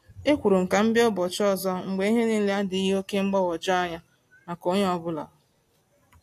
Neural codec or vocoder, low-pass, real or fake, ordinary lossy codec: none; 14.4 kHz; real; AAC, 64 kbps